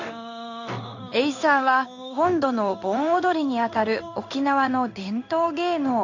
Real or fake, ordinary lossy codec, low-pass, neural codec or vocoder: fake; AAC, 48 kbps; 7.2 kHz; codec, 16 kHz in and 24 kHz out, 1 kbps, XY-Tokenizer